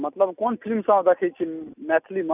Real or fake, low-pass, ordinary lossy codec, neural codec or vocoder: real; 3.6 kHz; none; none